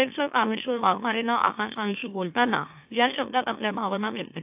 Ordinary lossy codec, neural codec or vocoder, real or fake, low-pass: AAC, 32 kbps; autoencoder, 44.1 kHz, a latent of 192 numbers a frame, MeloTTS; fake; 3.6 kHz